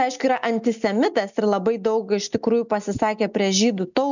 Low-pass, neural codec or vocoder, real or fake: 7.2 kHz; none; real